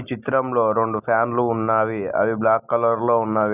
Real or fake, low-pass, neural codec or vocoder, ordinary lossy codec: real; 3.6 kHz; none; none